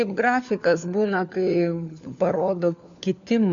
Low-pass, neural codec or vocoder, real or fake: 7.2 kHz; codec, 16 kHz, 4 kbps, FunCodec, trained on LibriTTS, 50 frames a second; fake